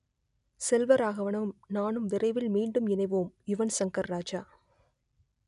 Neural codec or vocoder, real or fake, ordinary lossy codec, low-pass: none; real; none; 10.8 kHz